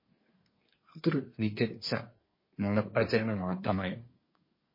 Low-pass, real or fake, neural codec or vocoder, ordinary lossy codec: 5.4 kHz; fake; codec, 24 kHz, 1 kbps, SNAC; MP3, 24 kbps